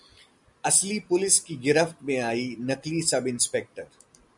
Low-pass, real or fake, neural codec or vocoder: 10.8 kHz; real; none